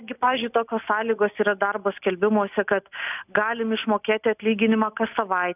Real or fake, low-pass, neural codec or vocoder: real; 3.6 kHz; none